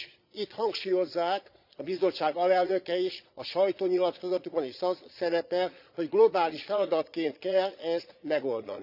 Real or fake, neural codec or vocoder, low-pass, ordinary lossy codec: fake; vocoder, 22.05 kHz, 80 mel bands, Vocos; 5.4 kHz; none